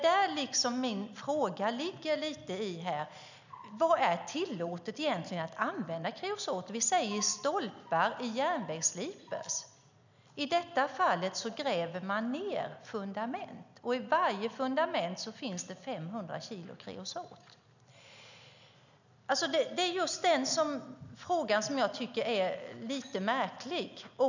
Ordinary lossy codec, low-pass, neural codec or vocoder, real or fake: none; 7.2 kHz; none; real